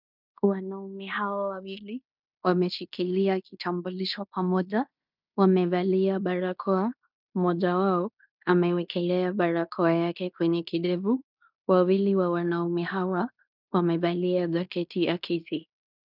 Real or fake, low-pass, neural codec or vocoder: fake; 5.4 kHz; codec, 16 kHz in and 24 kHz out, 0.9 kbps, LongCat-Audio-Codec, fine tuned four codebook decoder